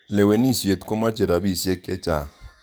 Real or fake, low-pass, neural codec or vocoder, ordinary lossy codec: fake; none; codec, 44.1 kHz, 7.8 kbps, DAC; none